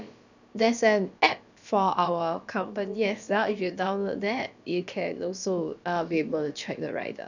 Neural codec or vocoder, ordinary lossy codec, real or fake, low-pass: codec, 16 kHz, about 1 kbps, DyCAST, with the encoder's durations; none; fake; 7.2 kHz